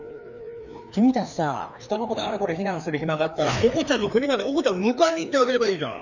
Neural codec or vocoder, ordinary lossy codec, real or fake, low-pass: codec, 16 kHz, 2 kbps, FreqCodec, larger model; none; fake; 7.2 kHz